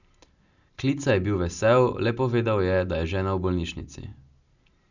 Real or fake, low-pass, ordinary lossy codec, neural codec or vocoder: real; 7.2 kHz; none; none